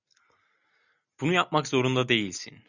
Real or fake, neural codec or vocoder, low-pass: real; none; 7.2 kHz